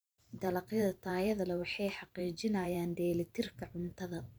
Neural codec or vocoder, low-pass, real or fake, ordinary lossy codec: vocoder, 44.1 kHz, 128 mel bands every 512 samples, BigVGAN v2; none; fake; none